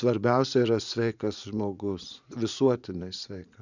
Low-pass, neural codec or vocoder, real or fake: 7.2 kHz; vocoder, 44.1 kHz, 80 mel bands, Vocos; fake